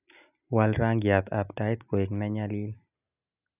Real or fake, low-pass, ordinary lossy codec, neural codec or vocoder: real; 3.6 kHz; none; none